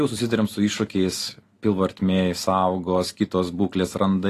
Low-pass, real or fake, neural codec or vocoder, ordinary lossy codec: 14.4 kHz; real; none; AAC, 48 kbps